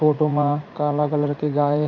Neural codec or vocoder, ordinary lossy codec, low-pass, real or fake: vocoder, 44.1 kHz, 80 mel bands, Vocos; none; 7.2 kHz; fake